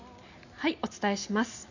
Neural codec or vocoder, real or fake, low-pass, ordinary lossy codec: none; real; 7.2 kHz; none